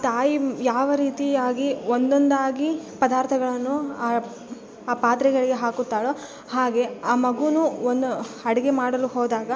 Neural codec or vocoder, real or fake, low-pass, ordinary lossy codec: none; real; none; none